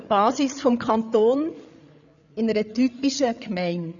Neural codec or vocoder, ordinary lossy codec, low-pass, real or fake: codec, 16 kHz, 8 kbps, FreqCodec, larger model; none; 7.2 kHz; fake